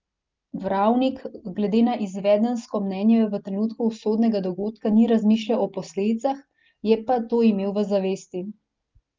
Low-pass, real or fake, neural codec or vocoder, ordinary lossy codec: 7.2 kHz; real; none; Opus, 32 kbps